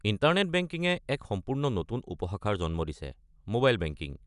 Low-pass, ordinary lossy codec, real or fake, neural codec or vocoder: 10.8 kHz; none; real; none